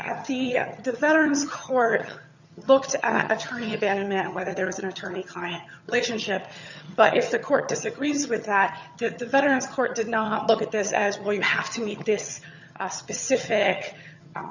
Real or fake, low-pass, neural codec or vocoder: fake; 7.2 kHz; vocoder, 22.05 kHz, 80 mel bands, HiFi-GAN